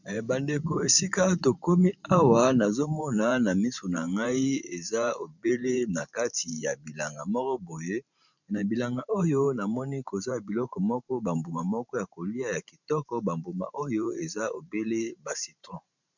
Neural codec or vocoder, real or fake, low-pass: vocoder, 44.1 kHz, 128 mel bands every 512 samples, BigVGAN v2; fake; 7.2 kHz